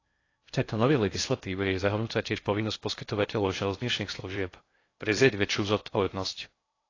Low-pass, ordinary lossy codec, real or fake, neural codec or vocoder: 7.2 kHz; AAC, 32 kbps; fake; codec, 16 kHz in and 24 kHz out, 0.6 kbps, FocalCodec, streaming, 2048 codes